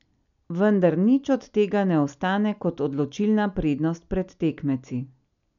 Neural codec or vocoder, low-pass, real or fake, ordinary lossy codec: none; 7.2 kHz; real; none